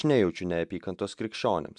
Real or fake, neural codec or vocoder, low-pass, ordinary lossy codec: real; none; 10.8 kHz; MP3, 96 kbps